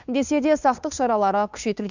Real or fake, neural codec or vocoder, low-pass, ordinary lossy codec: fake; codec, 16 kHz, 2 kbps, FunCodec, trained on Chinese and English, 25 frames a second; 7.2 kHz; none